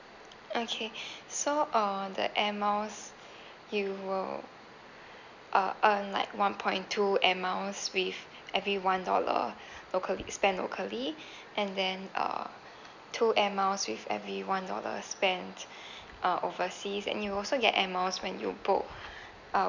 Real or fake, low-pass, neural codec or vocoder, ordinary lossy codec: real; 7.2 kHz; none; none